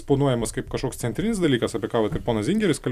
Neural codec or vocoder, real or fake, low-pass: vocoder, 44.1 kHz, 128 mel bands every 256 samples, BigVGAN v2; fake; 14.4 kHz